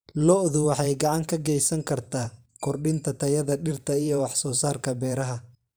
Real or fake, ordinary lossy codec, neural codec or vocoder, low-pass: fake; none; vocoder, 44.1 kHz, 128 mel bands every 512 samples, BigVGAN v2; none